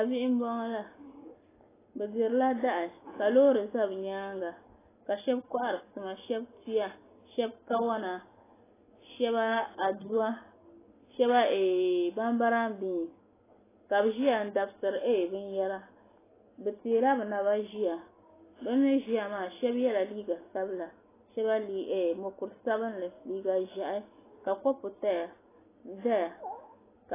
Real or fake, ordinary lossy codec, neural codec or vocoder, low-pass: real; AAC, 16 kbps; none; 3.6 kHz